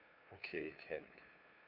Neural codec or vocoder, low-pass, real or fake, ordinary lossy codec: codec, 16 kHz, 2 kbps, FunCodec, trained on LibriTTS, 25 frames a second; 5.4 kHz; fake; none